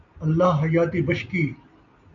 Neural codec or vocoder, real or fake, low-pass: none; real; 7.2 kHz